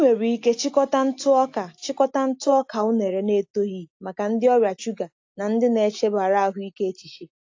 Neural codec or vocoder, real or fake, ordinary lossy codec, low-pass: none; real; AAC, 48 kbps; 7.2 kHz